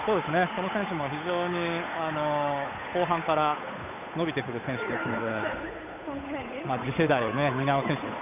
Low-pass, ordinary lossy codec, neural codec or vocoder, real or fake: 3.6 kHz; none; codec, 16 kHz, 8 kbps, FunCodec, trained on Chinese and English, 25 frames a second; fake